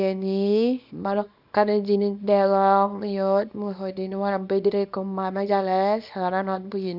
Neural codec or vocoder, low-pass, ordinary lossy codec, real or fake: codec, 24 kHz, 0.9 kbps, WavTokenizer, small release; 5.4 kHz; none; fake